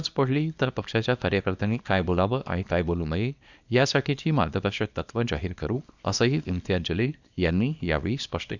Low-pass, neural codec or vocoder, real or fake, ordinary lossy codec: 7.2 kHz; codec, 24 kHz, 0.9 kbps, WavTokenizer, small release; fake; none